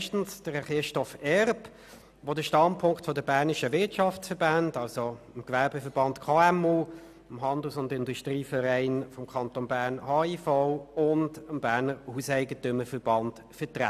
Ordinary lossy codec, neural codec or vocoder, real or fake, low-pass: none; none; real; 14.4 kHz